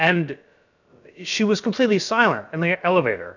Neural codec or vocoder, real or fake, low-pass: codec, 16 kHz, about 1 kbps, DyCAST, with the encoder's durations; fake; 7.2 kHz